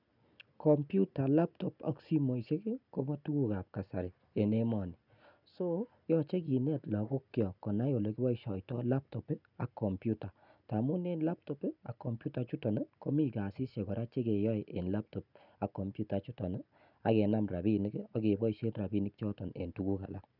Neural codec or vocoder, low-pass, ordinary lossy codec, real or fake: none; 5.4 kHz; none; real